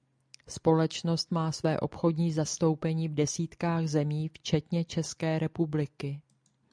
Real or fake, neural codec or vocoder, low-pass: real; none; 9.9 kHz